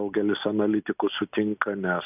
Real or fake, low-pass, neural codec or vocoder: real; 3.6 kHz; none